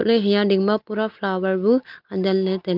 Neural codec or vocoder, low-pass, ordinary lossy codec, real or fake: none; 5.4 kHz; Opus, 24 kbps; real